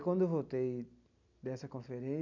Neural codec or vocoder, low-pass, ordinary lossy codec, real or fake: none; 7.2 kHz; none; real